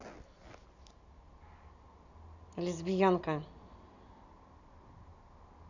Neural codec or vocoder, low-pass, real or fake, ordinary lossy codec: none; 7.2 kHz; real; none